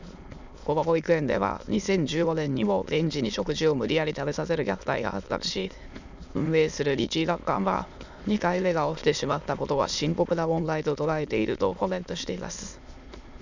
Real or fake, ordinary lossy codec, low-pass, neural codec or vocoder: fake; none; 7.2 kHz; autoencoder, 22.05 kHz, a latent of 192 numbers a frame, VITS, trained on many speakers